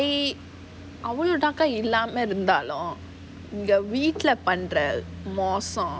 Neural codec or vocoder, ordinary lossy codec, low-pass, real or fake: none; none; none; real